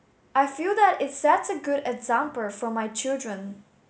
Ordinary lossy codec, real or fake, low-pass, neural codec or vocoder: none; real; none; none